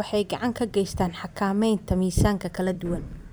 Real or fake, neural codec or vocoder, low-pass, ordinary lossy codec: fake; vocoder, 44.1 kHz, 128 mel bands every 256 samples, BigVGAN v2; none; none